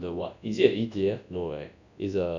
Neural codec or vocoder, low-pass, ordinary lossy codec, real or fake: codec, 24 kHz, 0.9 kbps, WavTokenizer, large speech release; 7.2 kHz; none; fake